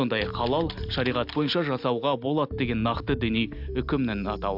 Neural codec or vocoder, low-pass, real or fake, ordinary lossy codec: none; 5.4 kHz; real; AAC, 48 kbps